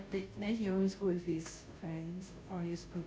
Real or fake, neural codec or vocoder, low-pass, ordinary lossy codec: fake; codec, 16 kHz, 0.5 kbps, FunCodec, trained on Chinese and English, 25 frames a second; none; none